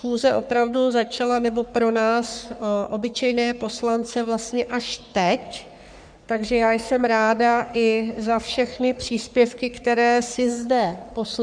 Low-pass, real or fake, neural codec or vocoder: 9.9 kHz; fake; codec, 44.1 kHz, 3.4 kbps, Pupu-Codec